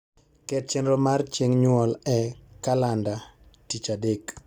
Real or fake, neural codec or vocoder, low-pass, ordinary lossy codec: real; none; 19.8 kHz; Opus, 64 kbps